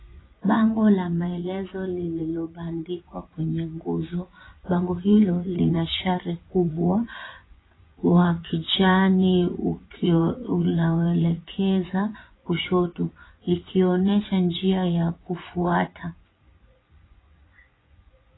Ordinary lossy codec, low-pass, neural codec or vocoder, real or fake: AAC, 16 kbps; 7.2 kHz; vocoder, 24 kHz, 100 mel bands, Vocos; fake